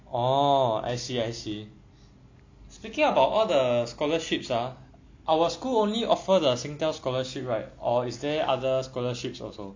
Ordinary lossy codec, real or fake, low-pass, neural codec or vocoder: MP3, 64 kbps; real; 7.2 kHz; none